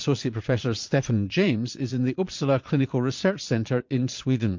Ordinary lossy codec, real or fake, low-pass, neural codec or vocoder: MP3, 48 kbps; fake; 7.2 kHz; vocoder, 22.05 kHz, 80 mel bands, Vocos